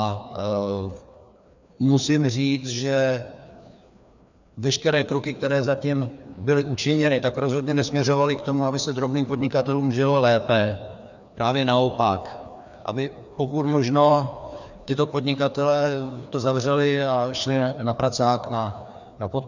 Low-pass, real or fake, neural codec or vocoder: 7.2 kHz; fake; codec, 16 kHz, 2 kbps, FreqCodec, larger model